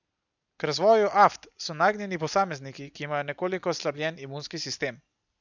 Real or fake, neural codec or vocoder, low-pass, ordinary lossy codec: real; none; 7.2 kHz; none